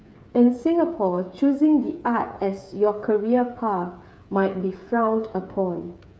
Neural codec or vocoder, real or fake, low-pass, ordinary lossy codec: codec, 16 kHz, 8 kbps, FreqCodec, smaller model; fake; none; none